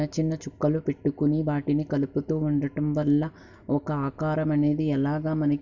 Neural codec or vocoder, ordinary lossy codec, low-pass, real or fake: none; none; 7.2 kHz; real